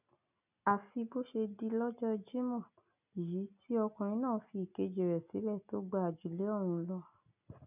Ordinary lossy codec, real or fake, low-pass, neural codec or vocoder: none; real; 3.6 kHz; none